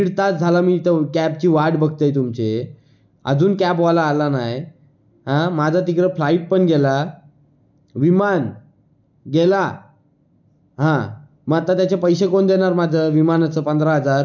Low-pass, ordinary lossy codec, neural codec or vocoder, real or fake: 7.2 kHz; none; none; real